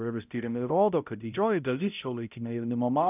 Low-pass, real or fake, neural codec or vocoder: 3.6 kHz; fake; codec, 16 kHz, 0.5 kbps, X-Codec, HuBERT features, trained on balanced general audio